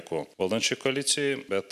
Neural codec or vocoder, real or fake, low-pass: none; real; 14.4 kHz